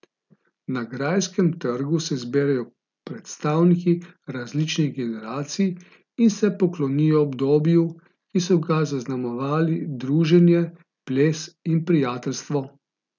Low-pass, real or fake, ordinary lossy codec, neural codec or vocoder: 7.2 kHz; real; none; none